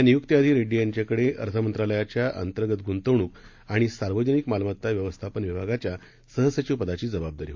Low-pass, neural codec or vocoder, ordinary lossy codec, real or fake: 7.2 kHz; none; MP3, 48 kbps; real